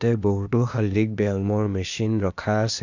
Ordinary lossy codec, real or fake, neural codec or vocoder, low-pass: none; fake; codec, 16 kHz, 0.8 kbps, ZipCodec; 7.2 kHz